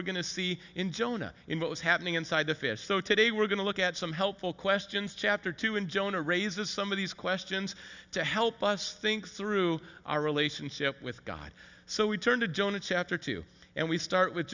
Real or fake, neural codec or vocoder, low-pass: real; none; 7.2 kHz